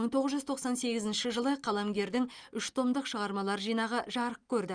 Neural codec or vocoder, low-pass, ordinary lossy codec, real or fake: none; 9.9 kHz; Opus, 32 kbps; real